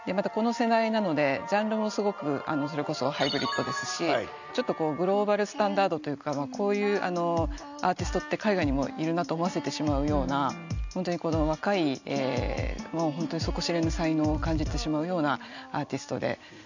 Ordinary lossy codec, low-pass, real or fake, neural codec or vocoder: none; 7.2 kHz; real; none